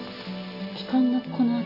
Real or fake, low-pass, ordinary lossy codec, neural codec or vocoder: real; 5.4 kHz; none; none